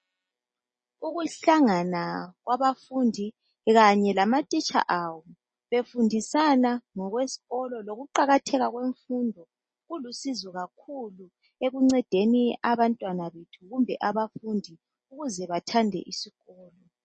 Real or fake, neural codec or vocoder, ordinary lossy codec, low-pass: real; none; MP3, 32 kbps; 10.8 kHz